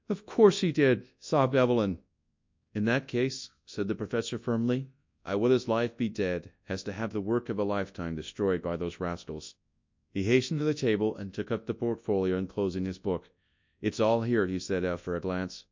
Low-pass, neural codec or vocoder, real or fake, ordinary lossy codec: 7.2 kHz; codec, 24 kHz, 0.9 kbps, WavTokenizer, large speech release; fake; MP3, 64 kbps